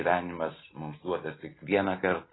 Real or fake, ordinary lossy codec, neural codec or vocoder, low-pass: real; AAC, 16 kbps; none; 7.2 kHz